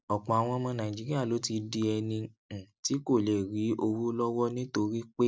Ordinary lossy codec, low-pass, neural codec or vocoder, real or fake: none; none; none; real